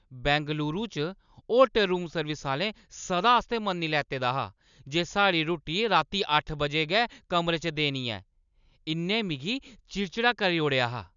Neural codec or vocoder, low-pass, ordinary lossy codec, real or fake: none; 7.2 kHz; none; real